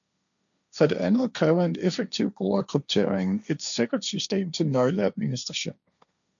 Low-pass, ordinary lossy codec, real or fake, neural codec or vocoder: 7.2 kHz; MP3, 96 kbps; fake; codec, 16 kHz, 1.1 kbps, Voila-Tokenizer